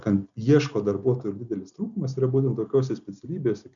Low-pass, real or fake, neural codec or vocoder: 7.2 kHz; real; none